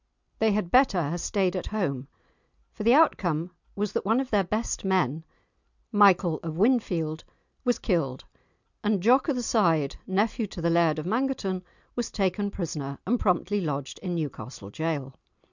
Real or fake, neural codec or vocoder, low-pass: real; none; 7.2 kHz